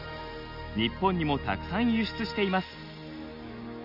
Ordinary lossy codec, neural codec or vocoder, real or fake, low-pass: none; none; real; 5.4 kHz